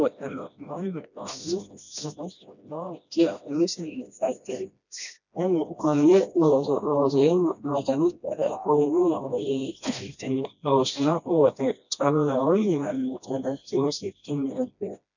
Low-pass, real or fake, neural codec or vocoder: 7.2 kHz; fake; codec, 16 kHz, 1 kbps, FreqCodec, smaller model